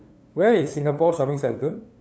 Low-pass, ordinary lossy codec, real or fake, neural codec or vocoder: none; none; fake; codec, 16 kHz, 2 kbps, FunCodec, trained on LibriTTS, 25 frames a second